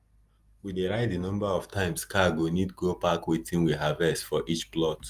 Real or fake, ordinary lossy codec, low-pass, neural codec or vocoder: real; Opus, 32 kbps; 14.4 kHz; none